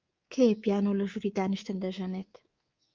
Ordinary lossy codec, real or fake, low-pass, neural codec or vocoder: Opus, 24 kbps; fake; 7.2 kHz; codec, 24 kHz, 3.1 kbps, DualCodec